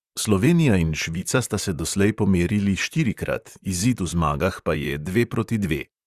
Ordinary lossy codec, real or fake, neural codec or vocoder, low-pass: Opus, 64 kbps; fake; vocoder, 44.1 kHz, 128 mel bands, Pupu-Vocoder; 14.4 kHz